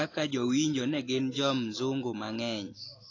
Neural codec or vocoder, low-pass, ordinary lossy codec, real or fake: none; 7.2 kHz; AAC, 32 kbps; real